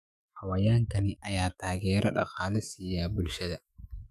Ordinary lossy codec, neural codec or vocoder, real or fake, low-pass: none; autoencoder, 48 kHz, 128 numbers a frame, DAC-VAE, trained on Japanese speech; fake; 14.4 kHz